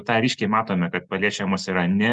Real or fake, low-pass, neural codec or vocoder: real; 10.8 kHz; none